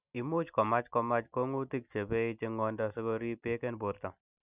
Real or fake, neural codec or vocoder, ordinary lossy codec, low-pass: real; none; none; 3.6 kHz